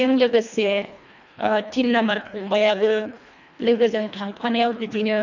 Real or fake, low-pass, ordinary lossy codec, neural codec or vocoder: fake; 7.2 kHz; none; codec, 24 kHz, 1.5 kbps, HILCodec